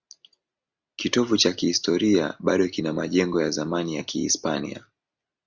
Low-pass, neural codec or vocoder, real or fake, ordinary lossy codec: 7.2 kHz; none; real; Opus, 64 kbps